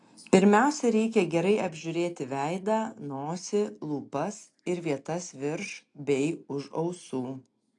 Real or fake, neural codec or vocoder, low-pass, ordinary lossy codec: real; none; 10.8 kHz; AAC, 48 kbps